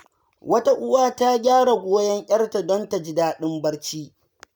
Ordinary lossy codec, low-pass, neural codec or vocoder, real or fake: none; none; none; real